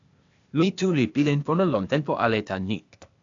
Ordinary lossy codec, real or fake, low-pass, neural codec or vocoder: AAC, 64 kbps; fake; 7.2 kHz; codec, 16 kHz, 0.8 kbps, ZipCodec